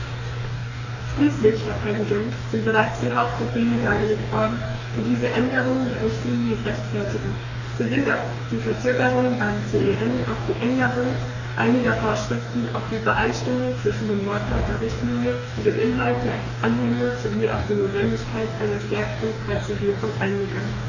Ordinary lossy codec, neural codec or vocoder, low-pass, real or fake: none; codec, 44.1 kHz, 2.6 kbps, DAC; 7.2 kHz; fake